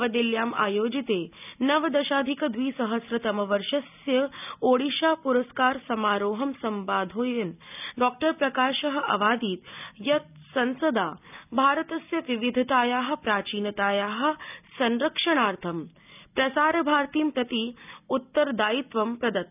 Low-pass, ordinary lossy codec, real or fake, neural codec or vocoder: 3.6 kHz; none; real; none